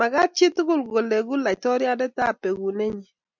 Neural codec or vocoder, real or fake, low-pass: none; real; 7.2 kHz